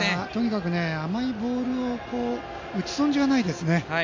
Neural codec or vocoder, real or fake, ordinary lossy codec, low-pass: none; real; MP3, 48 kbps; 7.2 kHz